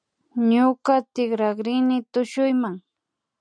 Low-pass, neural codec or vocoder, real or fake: 9.9 kHz; none; real